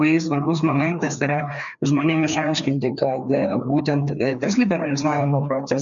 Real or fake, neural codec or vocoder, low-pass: fake; codec, 16 kHz, 2 kbps, FreqCodec, larger model; 7.2 kHz